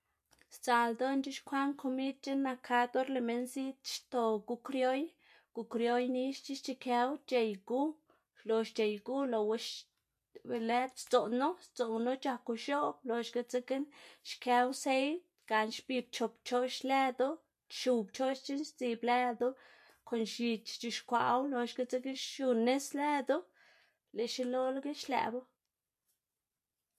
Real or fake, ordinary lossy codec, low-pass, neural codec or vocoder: real; MP3, 64 kbps; 14.4 kHz; none